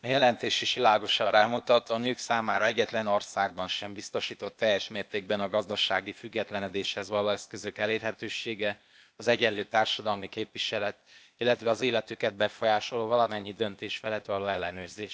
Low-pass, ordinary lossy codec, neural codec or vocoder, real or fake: none; none; codec, 16 kHz, 0.8 kbps, ZipCodec; fake